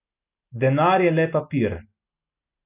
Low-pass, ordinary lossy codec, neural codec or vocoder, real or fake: 3.6 kHz; none; none; real